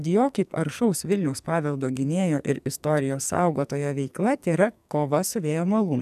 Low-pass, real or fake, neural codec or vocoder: 14.4 kHz; fake; codec, 44.1 kHz, 2.6 kbps, SNAC